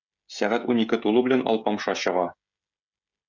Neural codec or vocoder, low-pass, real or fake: codec, 16 kHz, 16 kbps, FreqCodec, smaller model; 7.2 kHz; fake